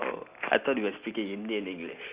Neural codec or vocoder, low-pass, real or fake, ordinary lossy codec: none; 3.6 kHz; real; Opus, 64 kbps